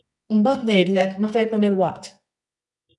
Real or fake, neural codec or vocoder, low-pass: fake; codec, 24 kHz, 0.9 kbps, WavTokenizer, medium music audio release; 10.8 kHz